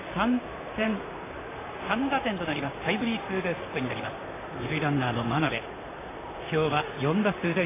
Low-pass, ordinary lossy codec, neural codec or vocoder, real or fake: 3.6 kHz; AAC, 16 kbps; vocoder, 44.1 kHz, 128 mel bands, Pupu-Vocoder; fake